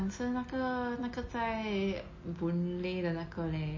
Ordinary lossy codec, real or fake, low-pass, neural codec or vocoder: MP3, 32 kbps; real; 7.2 kHz; none